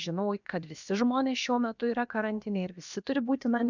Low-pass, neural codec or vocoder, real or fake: 7.2 kHz; codec, 16 kHz, about 1 kbps, DyCAST, with the encoder's durations; fake